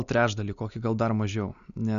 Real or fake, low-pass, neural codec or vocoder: real; 7.2 kHz; none